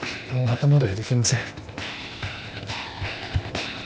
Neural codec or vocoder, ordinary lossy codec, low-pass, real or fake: codec, 16 kHz, 0.8 kbps, ZipCodec; none; none; fake